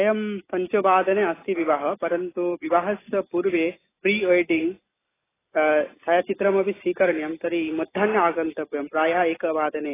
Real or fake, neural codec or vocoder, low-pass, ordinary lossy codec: real; none; 3.6 kHz; AAC, 16 kbps